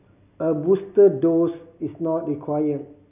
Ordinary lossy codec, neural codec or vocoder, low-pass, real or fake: none; none; 3.6 kHz; real